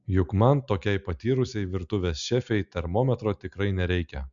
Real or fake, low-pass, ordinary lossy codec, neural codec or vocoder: real; 7.2 kHz; MP3, 64 kbps; none